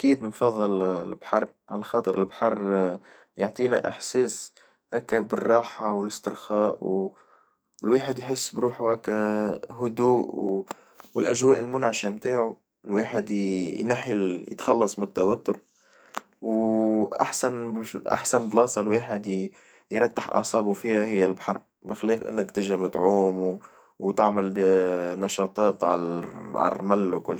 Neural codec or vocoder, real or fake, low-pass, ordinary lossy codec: codec, 44.1 kHz, 2.6 kbps, SNAC; fake; none; none